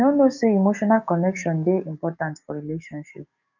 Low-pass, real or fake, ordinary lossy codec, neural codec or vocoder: 7.2 kHz; fake; none; codec, 44.1 kHz, 7.8 kbps, DAC